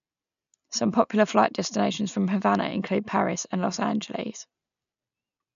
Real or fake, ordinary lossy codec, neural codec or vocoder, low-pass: real; none; none; 7.2 kHz